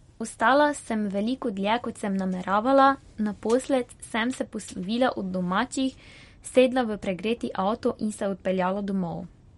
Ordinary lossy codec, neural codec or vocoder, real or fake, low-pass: MP3, 48 kbps; none; real; 19.8 kHz